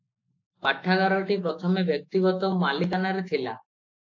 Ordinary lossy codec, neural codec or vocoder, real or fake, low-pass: AAC, 48 kbps; autoencoder, 48 kHz, 128 numbers a frame, DAC-VAE, trained on Japanese speech; fake; 7.2 kHz